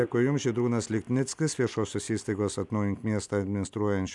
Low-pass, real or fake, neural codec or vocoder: 10.8 kHz; real; none